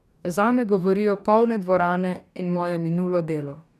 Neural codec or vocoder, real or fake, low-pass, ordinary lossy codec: codec, 44.1 kHz, 2.6 kbps, DAC; fake; 14.4 kHz; none